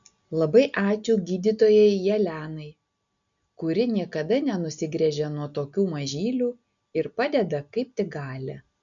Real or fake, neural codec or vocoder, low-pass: real; none; 7.2 kHz